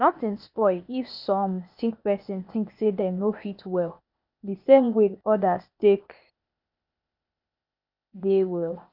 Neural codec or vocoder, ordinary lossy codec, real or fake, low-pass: codec, 16 kHz, 0.8 kbps, ZipCodec; none; fake; 5.4 kHz